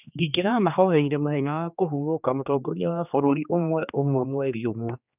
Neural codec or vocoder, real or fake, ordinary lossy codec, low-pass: codec, 16 kHz, 2 kbps, X-Codec, HuBERT features, trained on general audio; fake; none; 3.6 kHz